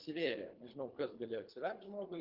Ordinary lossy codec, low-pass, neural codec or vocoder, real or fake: Opus, 32 kbps; 5.4 kHz; codec, 24 kHz, 3 kbps, HILCodec; fake